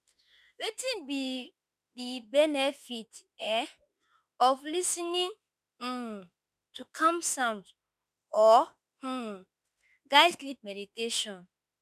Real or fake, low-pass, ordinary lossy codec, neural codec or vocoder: fake; 14.4 kHz; none; autoencoder, 48 kHz, 32 numbers a frame, DAC-VAE, trained on Japanese speech